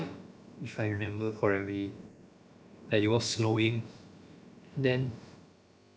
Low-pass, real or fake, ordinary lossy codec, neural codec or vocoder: none; fake; none; codec, 16 kHz, about 1 kbps, DyCAST, with the encoder's durations